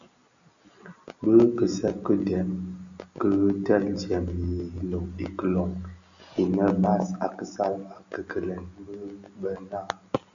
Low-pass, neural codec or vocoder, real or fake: 7.2 kHz; none; real